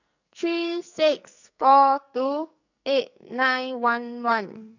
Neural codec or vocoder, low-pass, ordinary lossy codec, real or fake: codec, 44.1 kHz, 2.6 kbps, SNAC; 7.2 kHz; none; fake